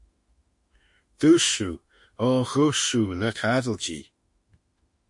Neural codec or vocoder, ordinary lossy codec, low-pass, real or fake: autoencoder, 48 kHz, 32 numbers a frame, DAC-VAE, trained on Japanese speech; MP3, 48 kbps; 10.8 kHz; fake